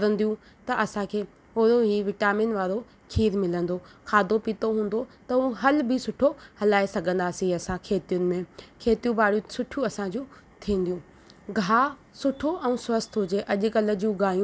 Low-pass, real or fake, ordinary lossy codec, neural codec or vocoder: none; real; none; none